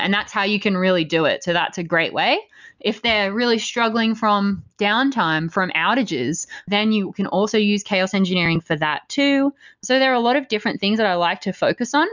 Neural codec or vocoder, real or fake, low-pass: none; real; 7.2 kHz